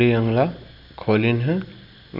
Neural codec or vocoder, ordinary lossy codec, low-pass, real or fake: none; none; 5.4 kHz; real